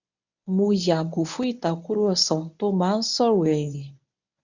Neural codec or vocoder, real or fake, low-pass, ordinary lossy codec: codec, 24 kHz, 0.9 kbps, WavTokenizer, medium speech release version 1; fake; 7.2 kHz; none